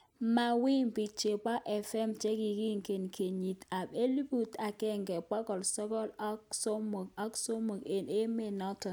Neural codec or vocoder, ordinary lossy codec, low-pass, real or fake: none; none; none; real